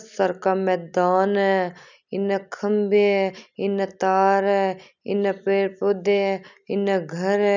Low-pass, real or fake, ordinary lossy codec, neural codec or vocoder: 7.2 kHz; real; none; none